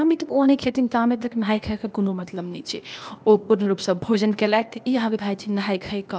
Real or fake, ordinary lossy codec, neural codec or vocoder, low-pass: fake; none; codec, 16 kHz, 0.8 kbps, ZipCodec; none